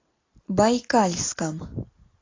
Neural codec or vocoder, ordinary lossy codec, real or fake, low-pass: none; AAC, 32 kbps; real; 7.2 kHz